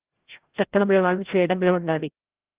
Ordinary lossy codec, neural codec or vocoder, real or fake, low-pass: Opus, 16 kbps; codec, 16 kHz, 0.5 kbps, FreqCodec, larger model; fake; 3.6 kHz